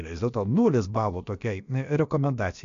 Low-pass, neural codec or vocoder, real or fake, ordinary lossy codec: 7.2 kHz; codec, 16 kHz, about 1 kbps, DyCAST, with the encoder's durations; fake; MP3, 64 kbps